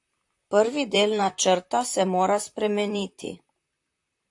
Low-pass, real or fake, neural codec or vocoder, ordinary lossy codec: 10.8 kHz; fake; vocoder, 44.1 kHz, 128 mel bands, Pupu-Vocoder; AAC, 64 kbps